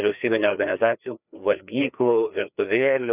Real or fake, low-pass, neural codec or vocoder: fake; 3.6 kHz; codec, 16 kHz, 2 kbps, FreqCodec, larger model